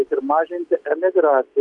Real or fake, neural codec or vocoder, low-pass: real; none; 10.8 kHz